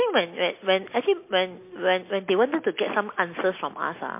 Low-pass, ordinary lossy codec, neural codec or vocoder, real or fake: 3.6 kHz; MP3, 24 kbps; none; real